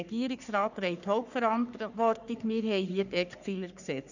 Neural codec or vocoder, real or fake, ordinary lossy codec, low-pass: codec, 44.1 kHz, 3.4 kbps, Pupu-Codec; fake; none; 7.2 kHz